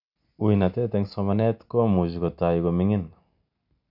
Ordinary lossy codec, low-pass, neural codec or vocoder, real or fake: none; 5.4 kHz; none; real